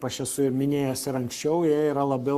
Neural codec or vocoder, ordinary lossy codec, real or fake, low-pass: codec, 44.1 kHz, 7.8 kbps, Pupu-Codec; Opus, 64 kbps; fake; 14.4 kHz